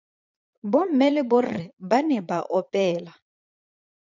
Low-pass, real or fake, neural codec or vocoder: 7.2 kHz; fake; vocoder, 22.05 kHz, 80 mel bands, Vocos